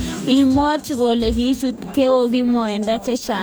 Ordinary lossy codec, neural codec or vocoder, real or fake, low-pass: none; codec, 44.1 kHz, 2.6 kbps, DAC; fake; none